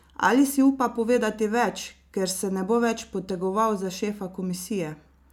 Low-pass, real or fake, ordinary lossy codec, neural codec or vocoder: 19.8 kHz; real; none; none